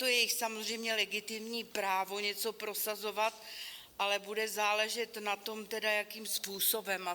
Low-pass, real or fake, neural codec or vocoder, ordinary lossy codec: 19.8 kHz; real; none; Opus, 64 kbps